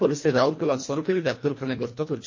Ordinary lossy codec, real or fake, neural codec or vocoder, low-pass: MP3, 32 kbps; fake; codec, 24 kHz, 1.5 kbps, HILCodec; 7.2 kHz